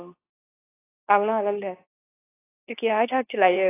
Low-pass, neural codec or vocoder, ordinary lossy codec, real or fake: 3.6 kHz; codec, 24 kHz, 0.9 kbps, WavTokenizer, medium speech release version 2; AAC, 16 kbps; fake